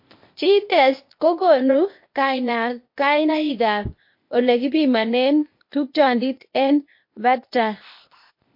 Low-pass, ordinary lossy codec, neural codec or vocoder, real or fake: 5.4 kHz; MP3, 32 kbps; codec, 16 kHz, 0.8 kbps, ZipCodec; fake